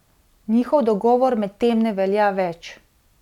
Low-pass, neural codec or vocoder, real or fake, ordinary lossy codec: 19.8 kHz; none; real; none